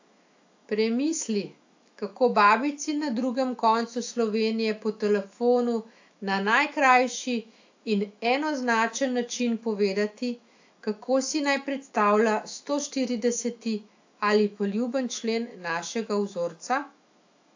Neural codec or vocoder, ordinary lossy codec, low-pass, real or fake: autoencoder, 48 kHz, 128 numbers a frame, DAC-VAE, trained on Japanese speech; AAC, 48 kbps; 7.2 kHz; fake